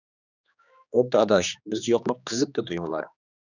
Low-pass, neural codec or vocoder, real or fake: 7.2 kHz; codec, 16 kHz, 2 kbps, X-Codec, HuBERT features, trained on general audio; fake